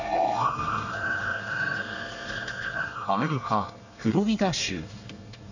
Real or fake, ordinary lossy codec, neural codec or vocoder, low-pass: fake; none; codec, 24 kHz, 1 kbps, SNAC; 7.2 kHz